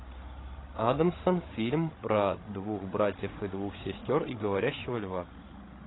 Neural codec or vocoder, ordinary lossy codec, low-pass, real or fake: codec, 16 kHz, 16 kbps, FreqCodec, larger model; AAC, 16 kbps; 7.2 kHz; fake